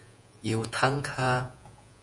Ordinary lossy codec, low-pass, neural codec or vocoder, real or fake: Opus, 64 kbps; 10.8 kHz; vocoder, 48 kHz, 128 mel bands, Vocos; fake